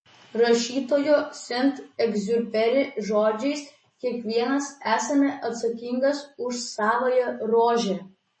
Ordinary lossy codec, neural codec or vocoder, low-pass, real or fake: MP3, 32 kbps; none; 9.9 kHz; real